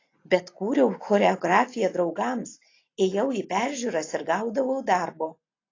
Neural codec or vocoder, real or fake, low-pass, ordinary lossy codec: none; real; 7.2 kHz; AAC, 32 kbps